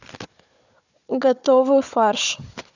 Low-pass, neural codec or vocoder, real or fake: 7.2 kHz; codec, 16 kHz, 4 kbps, FunCodec, trained on Chinese and English, 50 frames a second; fake